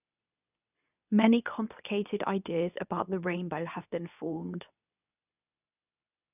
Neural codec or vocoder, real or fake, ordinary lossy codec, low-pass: codec, 24 kHz, 0.9 kbps, WavTokenizer, medium speech release version 2; fake; none; 3.6 kHz